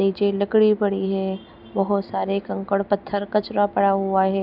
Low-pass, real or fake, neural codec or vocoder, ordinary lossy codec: 5.4 kHz; real; none; none